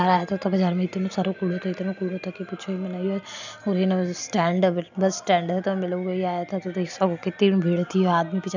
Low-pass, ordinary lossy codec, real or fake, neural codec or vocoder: 7.2 kHz; none; real; none